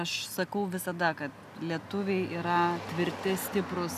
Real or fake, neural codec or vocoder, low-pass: real; none; 14.4 kHz